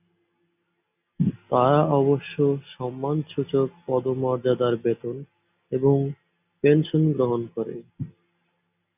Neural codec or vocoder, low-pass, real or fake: none; 3.6 kHz; real